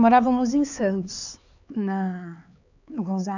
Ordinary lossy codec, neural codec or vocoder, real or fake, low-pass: none; codec, 16 kHz, 4 kbps, X-Codec, HuBERT features, trained on LibriSpeech; fake; 7.2 kHz